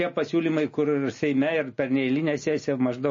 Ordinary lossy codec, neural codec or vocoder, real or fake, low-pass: MP3, 32 kbps; none; real; 7.2 kHz